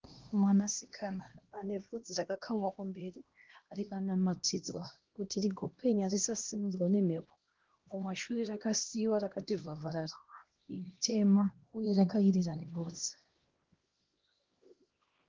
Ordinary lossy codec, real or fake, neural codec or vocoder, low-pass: Opus, 16 kbps; fake; codec, 16 kHz, 1 kbps, X-Codec, HuBERT features, trained on LibriSpeech; 7.2 kHz